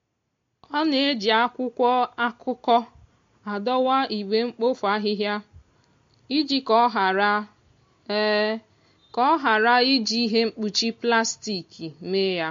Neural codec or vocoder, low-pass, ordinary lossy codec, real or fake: none; 7.2 kHz; MP3, 48 kbps; real